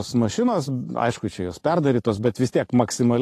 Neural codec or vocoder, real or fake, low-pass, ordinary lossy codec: vocoder, 44.1 kHz, 128 mel bands every 512 samples, BigVGAN v2; fake; 14.4 kHz; AAC, 48 kbps